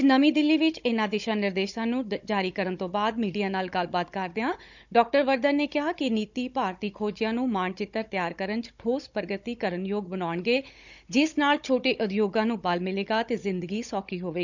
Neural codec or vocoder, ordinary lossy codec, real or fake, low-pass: codec, 16 kHz, 16 kbps, FunCodec, trained on Chinese and English, 50 frames a second; none; fake; 7.2 kHz